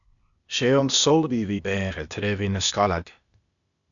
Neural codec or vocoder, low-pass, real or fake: codec, 16 kHz, 0.8 kbps, ZipCodec; 7.2 kHz; fake